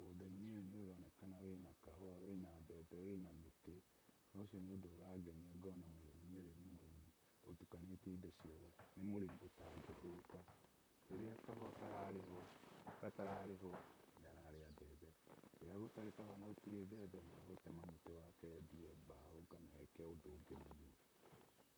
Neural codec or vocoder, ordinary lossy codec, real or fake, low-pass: vocoder, 44.1 kHz, 128 mel bands, Pupu-Vocoder; none; fake; none